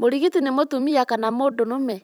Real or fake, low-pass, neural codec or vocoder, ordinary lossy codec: fake; none; vocoder, 44.1 kHz, 128 mel bands every 256 samples, BigVGAN v2; none